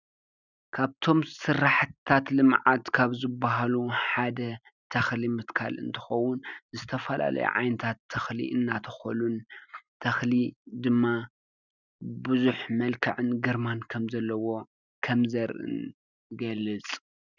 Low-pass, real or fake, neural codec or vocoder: 7.2 kHz; real; none